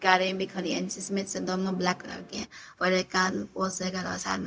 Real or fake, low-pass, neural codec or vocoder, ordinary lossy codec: fake; none; codec, 16 kHz, 0.4 kbps, LongCat-Audio-Codec; none